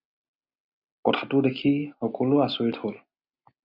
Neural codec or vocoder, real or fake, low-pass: none; real; 5.4 kHz